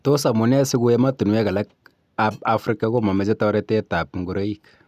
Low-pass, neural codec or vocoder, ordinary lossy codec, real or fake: 14.4 kHz; none; none; real